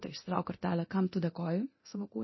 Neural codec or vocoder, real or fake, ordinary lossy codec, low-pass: codec, 24 kHz, 0.9 kbps, DualCodec; fake; MP3, 24 kbps; 7.2 kHz